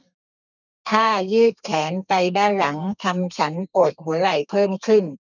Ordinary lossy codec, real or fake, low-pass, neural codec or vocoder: none; fake; 7.2 kHz; codec, 32 kHz, 1.9 kbps, SNAC